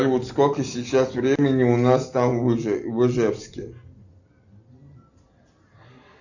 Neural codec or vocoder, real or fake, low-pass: none; real; 7.2 kHz